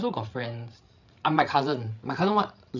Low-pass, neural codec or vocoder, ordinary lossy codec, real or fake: 7.2 kHz; codec, 16 kHz, 8 kbps, FreqCodec, larger model; none; fake